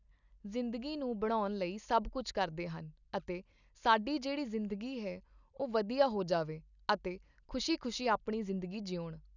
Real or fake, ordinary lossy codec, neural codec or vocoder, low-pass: real; none; none; 7.2 kHz